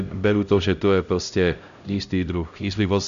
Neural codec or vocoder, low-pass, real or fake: codec, 16 kHz, 0.5 kbps, X-Codec, HuBERT features, trained on LibriSpeech; 7.2 kHz; fake